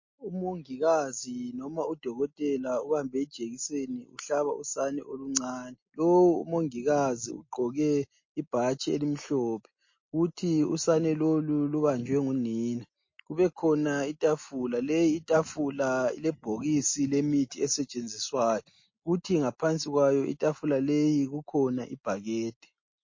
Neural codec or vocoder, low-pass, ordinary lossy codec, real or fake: none; 7.2 kHz; MP3, 32 kbps; real